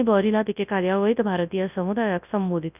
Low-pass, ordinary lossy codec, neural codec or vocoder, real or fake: 3.6 kHz; none; codec, 24 kHz, 0.9 kbps, WavTokenizer, large speech release; fake